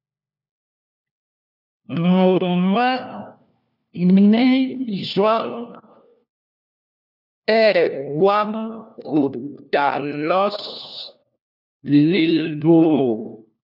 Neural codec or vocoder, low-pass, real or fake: codec, 16 kHz, 1 kbps, FunCodec, trained on LibriTTS, 50 frames a second; 5.4 kHz; fake